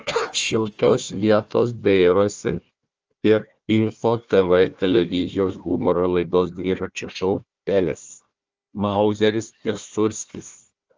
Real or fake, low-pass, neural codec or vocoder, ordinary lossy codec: fake; 7.2 kHz; codec, 16 kHz, 1 kbps, FunCodec, trained on Chinese and English, 50 frames a second; Opus, 24 kbps